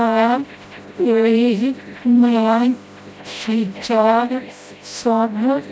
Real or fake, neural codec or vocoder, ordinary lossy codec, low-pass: fake; codec, 16 kHz, 0.5 kbps, FreqCodec, smaller model; none; none